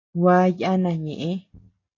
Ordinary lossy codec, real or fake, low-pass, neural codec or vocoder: AAC, 32 kbps; real; 7.2 kHz; none